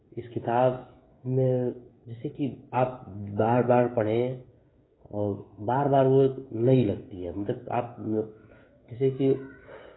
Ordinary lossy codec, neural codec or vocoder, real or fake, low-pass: AAC, 16 kbps; codec, 16 kHz, 16 kbps, FreqCodec, smaller model; fake; 7.2 kHz